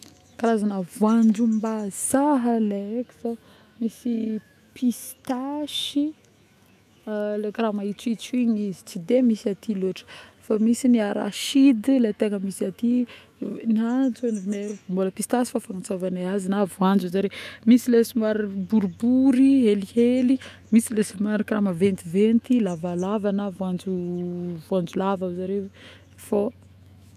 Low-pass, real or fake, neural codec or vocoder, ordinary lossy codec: 14.4 kHz; fake; autoencoder, 48 kHz, 128 numbers a frame, DAC-VAE, trained on Japanese speech; none